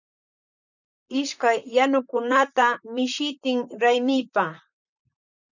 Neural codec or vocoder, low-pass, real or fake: vocoder, 44.1 kHz, 128 mel bands, Pupu-Vocoder; 7.2 kHz; fake